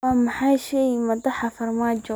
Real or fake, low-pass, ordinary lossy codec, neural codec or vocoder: real; none; none; none